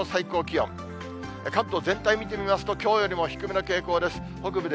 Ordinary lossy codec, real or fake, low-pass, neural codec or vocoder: none; real; none; none